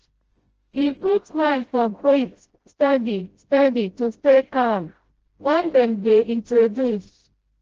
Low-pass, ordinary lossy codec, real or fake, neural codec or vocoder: 7.2 kHz; Opus, 16 kbps; fake; codec, 16 kHz, 0.5 kbps, FreqCodec, smaller model